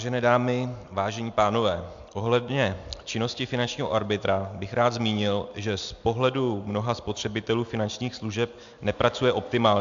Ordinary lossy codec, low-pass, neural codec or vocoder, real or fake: MP3, 64 kbps; 7.2 kHz; none; real